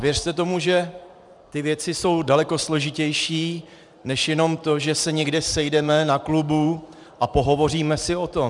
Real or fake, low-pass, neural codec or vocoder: fake; 10.8 kHz; vocoder, 44.1 kHz, 128 mel bands every 512 samples, BigVGAN v2